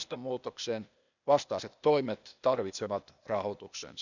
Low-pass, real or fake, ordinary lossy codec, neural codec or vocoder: 7.2 kHz; fake; none; codec, 16 kHz, 0.8 kbps, ZipCodec